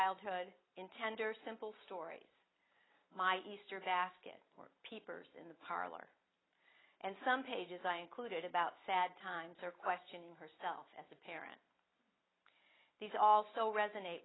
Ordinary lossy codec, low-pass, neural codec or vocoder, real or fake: AAC, 16 kbps; 7.2 kHz; none; real